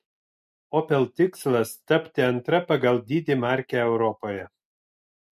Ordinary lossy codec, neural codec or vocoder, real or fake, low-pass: MP3, 64 kbps; none; real; 14.4 kHz